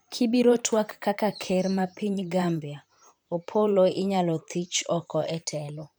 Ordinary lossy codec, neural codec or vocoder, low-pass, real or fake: none; vocoder, 44.1 kHz, 128 mel bands, Pupu-Vocoder; none; fake